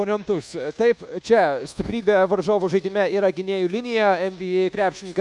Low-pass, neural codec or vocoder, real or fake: 10.8 kHz; codec, 24 kHz, 1.2 kbps, DualCodec; fake